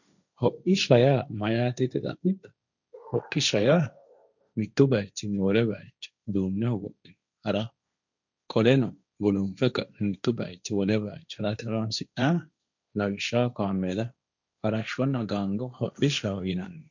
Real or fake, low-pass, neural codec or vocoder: fake; 7.2 kHz; codec, 16 kHz, 1.1 kbps, Voila-Tokenizer